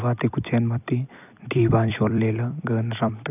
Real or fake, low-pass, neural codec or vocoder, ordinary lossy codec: fake; 3.6 kHz; vocoder, 44.1 kHz, 128 mel bands every 256 samples, BigVGAN v2; none